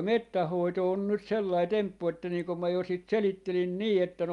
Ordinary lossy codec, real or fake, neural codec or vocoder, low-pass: Opus, 32 kbps; real; none; 10.8 kHz